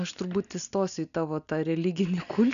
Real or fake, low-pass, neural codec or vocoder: real; 7.2 kHz; none